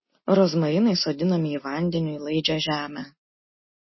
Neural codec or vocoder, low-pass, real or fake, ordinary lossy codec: none; 7.2 kHz; real; MP3, 24 kbps